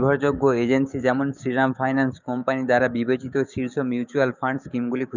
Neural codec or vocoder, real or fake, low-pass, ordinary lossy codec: codec, 16 kHz, 6 kbps, DAC; fake; 7.2 kHz; none